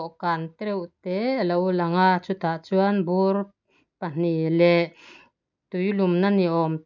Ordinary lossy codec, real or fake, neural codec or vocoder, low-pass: none; real; none; 7.2 kHz